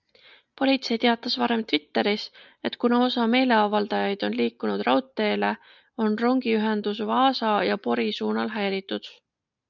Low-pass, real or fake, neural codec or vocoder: 7.2 kHz; real; none